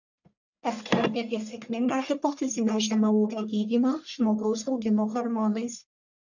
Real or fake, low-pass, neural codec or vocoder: fake; 7.2 kHz; codec, 44.1 kHz, 1.7 kbps, Pupu-Codec